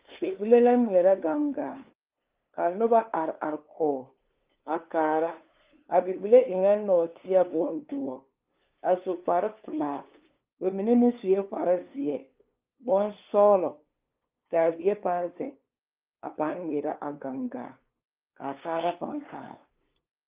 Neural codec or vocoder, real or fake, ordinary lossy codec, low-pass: codec, 16 kHz, 2 kbps, FunCodec, trained on LibriTTS, 25 frames a second; fake; Opus, 24 kbps; 3.6 kHz